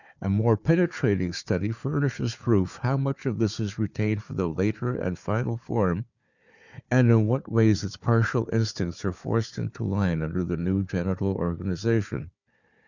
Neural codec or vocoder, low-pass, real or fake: codec, 16 kHz, 4 kbps, FunCodec, trained on Chinese and English, 50 frames a second; 7.2 kHz; fake